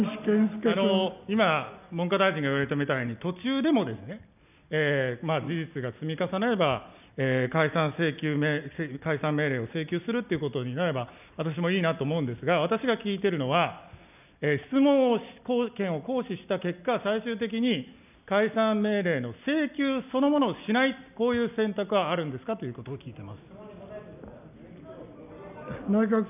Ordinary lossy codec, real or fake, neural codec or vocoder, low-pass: none; real; none; 3.6 kHz